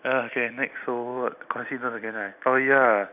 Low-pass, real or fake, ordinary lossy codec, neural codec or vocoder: 3.6 kHz; real; none; none